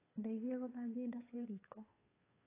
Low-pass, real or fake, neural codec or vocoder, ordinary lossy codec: 3.6 kHz; fake; codec, 16 kHz, 2 kbps, FunCodec, trained on Chinese and English, 25 frames a second; AAC, 24 kbps